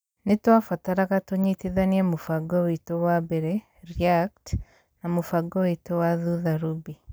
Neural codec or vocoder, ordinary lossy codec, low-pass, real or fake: none; none; none; real